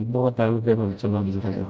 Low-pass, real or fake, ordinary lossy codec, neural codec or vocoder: none; fake; none; codec, 16 kHz, 0.5 kbps, FreqCodec, smaller model